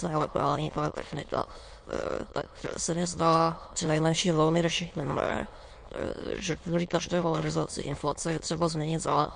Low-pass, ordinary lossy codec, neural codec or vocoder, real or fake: 9.9 kHz; MP3, 48 kbps; autoencoder, 22.05 kHz, a latent of 192 numbers a frame, VITS, trained on many speakers; fake